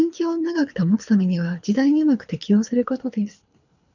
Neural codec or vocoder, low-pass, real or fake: codec, 24 kHz, 6 kbps, HILCodec; 7.2 kHz; fake